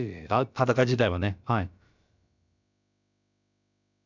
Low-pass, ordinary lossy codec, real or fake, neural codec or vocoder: 7.2 kHz; none; fake; codec, 16 kHz, about 1 kbps, DyCAST, with the encoder's durations